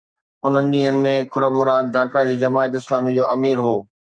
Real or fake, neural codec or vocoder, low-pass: fake; codec, 32 kHz, 1.9 kbps, SNAC; 9.9 kHz